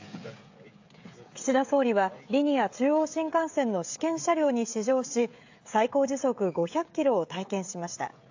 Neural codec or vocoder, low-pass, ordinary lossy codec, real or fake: codec, 16 kHz, 16 kbps, FreqCodec, smaller model; 7.2 kHz; AAC, 48 kbps; fake